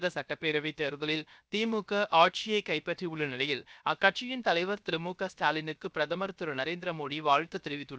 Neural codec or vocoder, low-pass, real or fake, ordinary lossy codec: codec, 16 kHz, 0.7 kbps, FocalCodec; none; fake; none